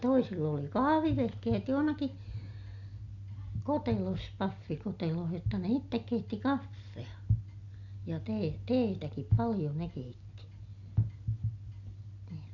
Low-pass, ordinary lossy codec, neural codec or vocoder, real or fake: 7.2 kHz; none; none; real